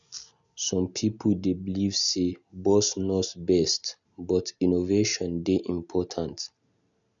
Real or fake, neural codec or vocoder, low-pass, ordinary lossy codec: real; none; 7.2 kHz; none